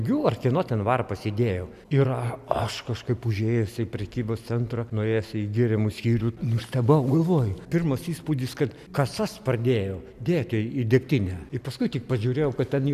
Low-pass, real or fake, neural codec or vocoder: 14.4 kHz; real; none